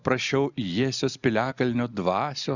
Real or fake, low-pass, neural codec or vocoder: real; 7.2 kHz; none